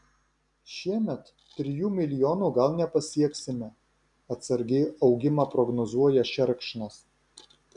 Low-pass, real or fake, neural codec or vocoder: 10.8 kHz; real; none